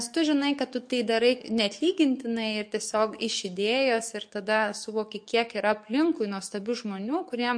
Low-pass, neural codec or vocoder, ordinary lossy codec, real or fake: 9.9 kHz; codec, 44.1 kHz, 7.8 kbps, DAC; MP3, 48 kbps; fake